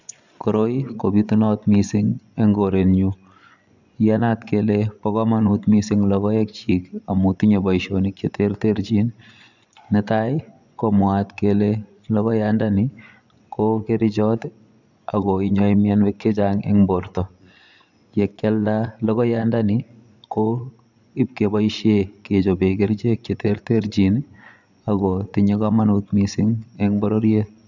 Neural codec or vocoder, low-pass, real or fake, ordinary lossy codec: vocoder, 24 kHz, 100 mel bands, Vocos; 7.2 kHz; fake; none